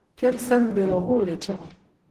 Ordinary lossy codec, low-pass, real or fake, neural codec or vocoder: Opus, 16 kbps; 14.4 kHz; fake; codec, 44.1 kHz, 0.9 kbps, DAC